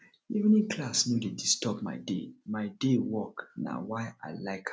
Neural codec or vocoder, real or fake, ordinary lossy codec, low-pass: none; real; none; none